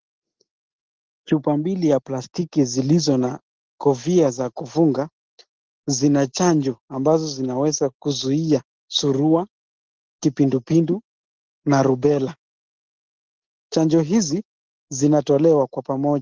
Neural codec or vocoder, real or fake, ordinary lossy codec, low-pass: none; real; Opus, 16 kbps; 7.2 kHz